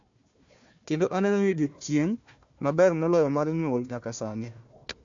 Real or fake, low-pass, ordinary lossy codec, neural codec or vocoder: fake; 7.2 kHz; MP3, 64 kbps; codec, 16 kHz, 1 kbps, FunCodec, trained on Chinese and English, 50 frames a second